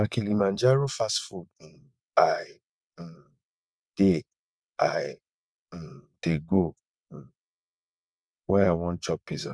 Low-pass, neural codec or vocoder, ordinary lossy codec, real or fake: none; vocoder, 22.05 kHz, 80 mel bands, WaveNeXt; none; fake